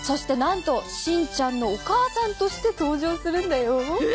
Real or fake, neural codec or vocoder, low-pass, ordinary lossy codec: real; none; none; none